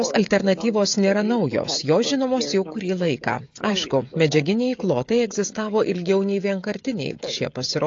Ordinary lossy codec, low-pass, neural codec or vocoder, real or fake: AAC, 48 kbps; 7.2 kHz; codec, 16 kHz, 16 kbps, FreqCodec, smaller model; fake